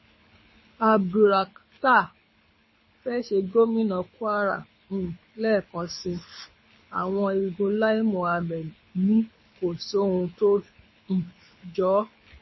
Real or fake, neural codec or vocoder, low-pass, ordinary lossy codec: fake; codec, 24 kHz, 6 kbps, HILCodec; 7.2 kHz; MP3, 24 kbps